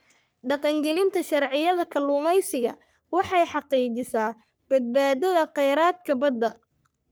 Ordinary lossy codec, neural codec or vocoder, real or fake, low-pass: none; codec, 44.1 kHz, 3.4 kbps, Pupu-Codec; fake; none